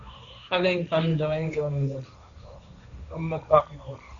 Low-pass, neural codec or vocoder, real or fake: 7.2 kHz; codec, 16 kHz, 1.1 kbps, Voila-Tokenizer; fake